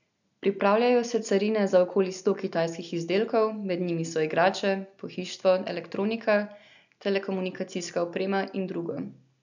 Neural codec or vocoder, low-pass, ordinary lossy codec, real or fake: none; 7.2 kHz; none; real